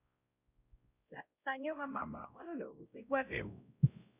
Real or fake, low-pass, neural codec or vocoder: fake; 3.6 kHz; codec, 16 kHz, 0.5 kbps, X-Codec, WavLM features, trained on Multilingual LibriSpeech